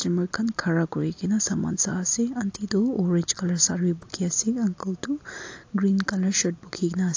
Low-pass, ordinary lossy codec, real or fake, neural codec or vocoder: 7.2 kHz; AAC, 48 kbps; real; none